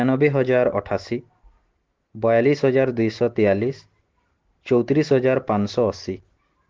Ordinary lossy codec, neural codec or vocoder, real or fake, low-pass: Opus, 16 kbps; none; real; 7.2 kHz